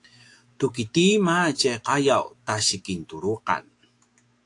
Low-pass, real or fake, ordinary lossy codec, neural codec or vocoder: 10.8 kHz; fake; AAC, 48 kbps; autoencoder, 48 kHz, 128 numbers a frame, DAC-VAE, trained on Japanese speech